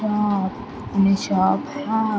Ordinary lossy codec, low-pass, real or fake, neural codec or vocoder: none; none; real; none